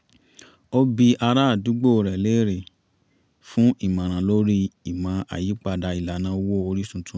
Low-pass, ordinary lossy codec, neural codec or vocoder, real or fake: none; none; none; real